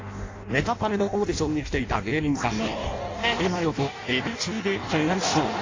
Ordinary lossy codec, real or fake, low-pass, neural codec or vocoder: AAC, 32 kbps; fake; 7.2 kHz; codec, 16 kHz in and 24 kHz out, 0.6 kbps, FireRedTTS-2 codec